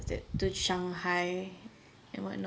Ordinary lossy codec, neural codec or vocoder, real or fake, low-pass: none; none; real; none